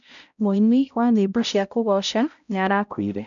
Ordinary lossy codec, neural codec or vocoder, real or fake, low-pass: none; codec, 16 kHz, 0.5 kbps, X-Codec, HuBERT features, trained on balanced general audio; fake; 7.2 kHz